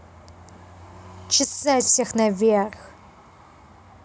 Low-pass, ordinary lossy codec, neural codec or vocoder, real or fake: none; none; none; real